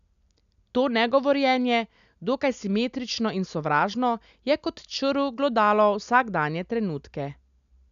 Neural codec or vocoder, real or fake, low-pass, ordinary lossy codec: none; real; 7.2 kHz; none